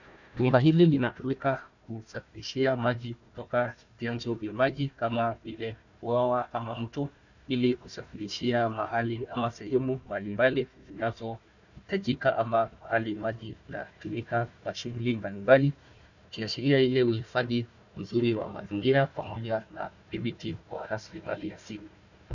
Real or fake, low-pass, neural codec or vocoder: fake; 7.2 kHz; codec, 16 kHz, 1 kbps, FunCodec, trained on Chinese and English, 50 frames a second